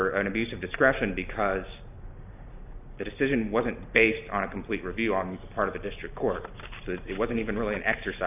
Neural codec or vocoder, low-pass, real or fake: none; 3.6 kHz; real